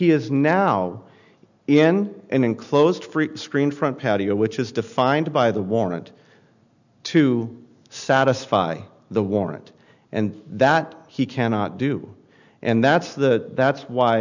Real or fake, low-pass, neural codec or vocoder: real; 7.2 kHz; none